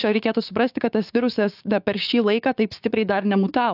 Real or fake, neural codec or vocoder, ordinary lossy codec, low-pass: fake; codec, 16 kHz, 6 kbps, DAC; AAC, 48 kbps; 5.4 kHz